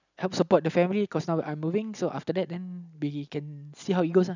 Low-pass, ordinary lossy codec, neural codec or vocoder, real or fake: 7.2 kHz; none; none; real